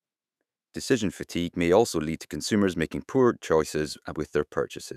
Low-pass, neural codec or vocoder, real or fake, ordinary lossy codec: 14.4 kHz; autoencoder, 48 kHz, 128 numbers a frame, DAC-VAE, trained on Japanese speech; fake; none